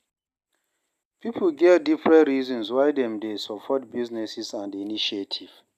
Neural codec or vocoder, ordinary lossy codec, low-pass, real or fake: none; none; 14.4 kHz; real